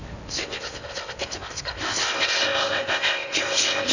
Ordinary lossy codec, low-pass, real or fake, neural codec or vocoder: none; 7.2 kHz; fake; codec, 16 kHz in and 24 kHz out, 0.6 kbps, FocalCodec, streaming, 4096 codes